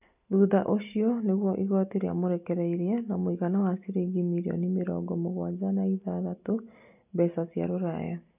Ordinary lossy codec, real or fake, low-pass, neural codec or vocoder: none; real; 3.6 kHz; none